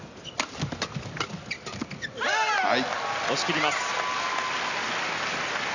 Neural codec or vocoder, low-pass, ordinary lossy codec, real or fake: none; 7.2 kHz; none; real